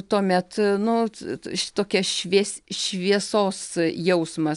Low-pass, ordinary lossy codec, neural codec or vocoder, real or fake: 10.8 kHz; MP3, 96 kbps; none; real